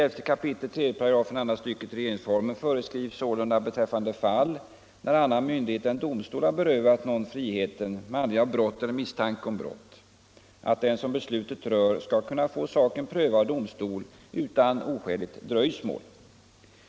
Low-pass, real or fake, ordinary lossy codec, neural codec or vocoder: none; real; none; none